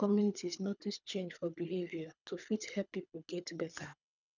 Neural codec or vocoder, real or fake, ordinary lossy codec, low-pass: codec, 24 kHz, 3 kbps, HILCodec; fake; none; 7.2 kHz